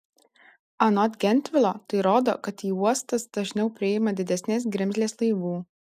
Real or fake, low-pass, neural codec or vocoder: real; 14.4 kHz; none